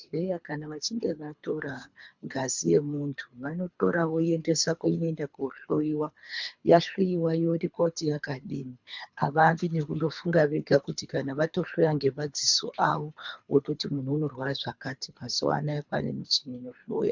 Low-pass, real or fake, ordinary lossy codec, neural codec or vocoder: 7.2 kHz; fake; MP3, 64 kbps; codec, 24 kHz, 3 kbps, HILCodec